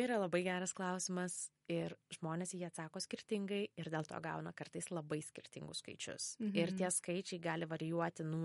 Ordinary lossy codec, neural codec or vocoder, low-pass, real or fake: MP3, 48 kbps; none; 19.8 kHz; real